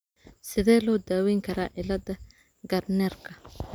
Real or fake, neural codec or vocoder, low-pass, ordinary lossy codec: real; none; none; none